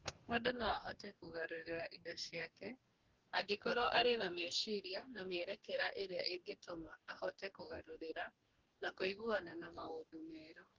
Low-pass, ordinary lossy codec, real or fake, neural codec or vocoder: 7.2 kHz; Opus, 16 kbps; fake; codec, 44.1 kHz, 2.6 kbps, DAC